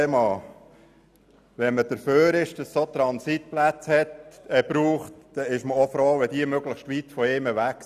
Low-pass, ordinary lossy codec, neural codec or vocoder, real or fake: 14.4 kHz; none; none; real